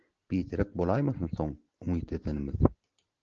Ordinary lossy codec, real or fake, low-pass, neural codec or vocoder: Opus, 16 kbps; real; 7.2 kHz; none